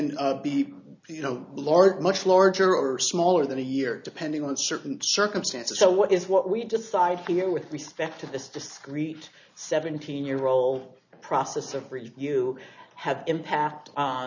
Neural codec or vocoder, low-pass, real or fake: none; 7.2 kHz; real